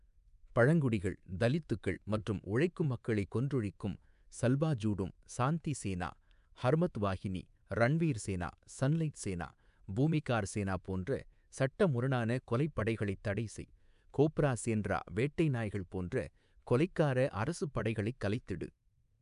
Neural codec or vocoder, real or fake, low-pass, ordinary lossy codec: codec, 24 kHz, 3.1 kbps, DualCodec; fake; 10.8 kHz; MP3, 96 kbps